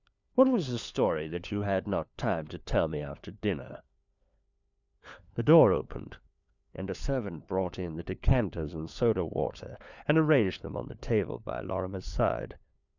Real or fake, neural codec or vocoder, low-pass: fake; codec, 16 kHz, 4 kbps, FunCodec, trained on LibriTTS, 50 frames a second; 7.2 kHz